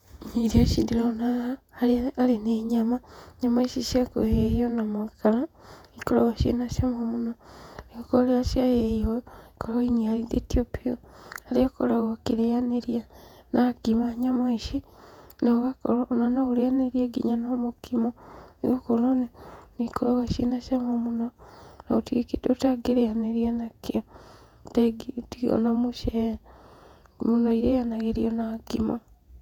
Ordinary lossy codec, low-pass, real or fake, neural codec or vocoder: none; 19.8 kHz; fake; vocoder, 48 kHz, 128 mel bands, Vocos